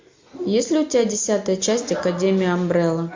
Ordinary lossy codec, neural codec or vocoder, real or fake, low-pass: MP3, 48 kbps; none; real; 7.2 kHz